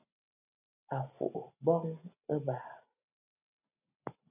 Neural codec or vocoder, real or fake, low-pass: none; real; 3.6 kHz